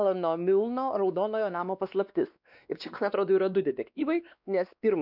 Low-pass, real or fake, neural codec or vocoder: 5.4 kHz; fake; codec, 16 kHz, 2 kbps, X-Codec, WavLM features, trained on Multilingual LibriSpeech